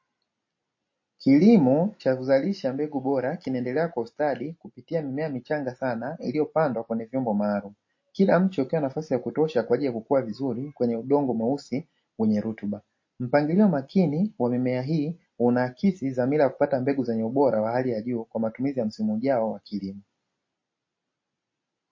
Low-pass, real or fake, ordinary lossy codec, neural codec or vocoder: 7.2 kHz; real; MP3, 32 kbps; none